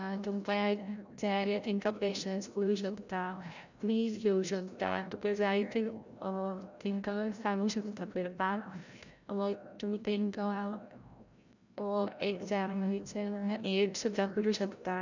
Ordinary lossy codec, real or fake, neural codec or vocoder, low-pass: none; fake; codec, 16 kHz, 0.5 kbps, FreqCodec, larger model; 7.2 kHz